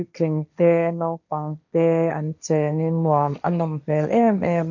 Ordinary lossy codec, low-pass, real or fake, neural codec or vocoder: none; 7.2 kHz; fake; codec, 16 kHz, 1.1 kbps, Voila-Tokenizer